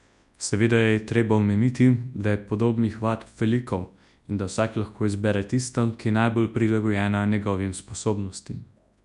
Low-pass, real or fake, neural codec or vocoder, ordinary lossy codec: 10.8 kHz; fake; codec, 24 kHz, 0.9 kbps, WavTokenizer, large speech release; none